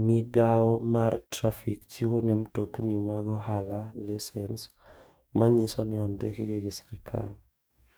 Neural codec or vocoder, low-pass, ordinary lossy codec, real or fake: codec, 44.1 kHz, 2.6 kbps, DAC; none; none; fake